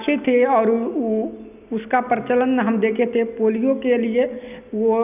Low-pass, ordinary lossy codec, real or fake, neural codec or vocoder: 3.6 kHz; none; real; none